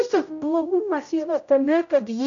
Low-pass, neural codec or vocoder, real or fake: 7.2 kHz; codec, 16 kHz, 0.5 kbps, X-Codec, HuBERT features, trained on general audio; fake